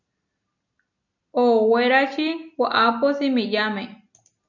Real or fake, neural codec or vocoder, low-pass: real; none; 7.2 kHz